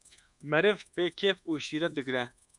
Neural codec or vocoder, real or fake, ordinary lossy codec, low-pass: codec, 24 kHz, 1.2 kbps, DualCodec; fake; MP3, 96 kbps; 10.8 kHz